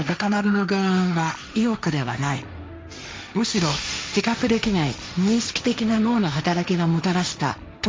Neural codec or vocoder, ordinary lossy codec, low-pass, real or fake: codec, 16 kHz, 1.1 kbps, Voila-Tokenizer; none; none; fake